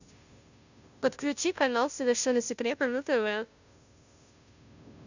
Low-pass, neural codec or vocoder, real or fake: 7.2 kHz; codec, 16 kHz, 0.5 kbps, FunCodec, trained on Chinese and English, 25 frames a second; fake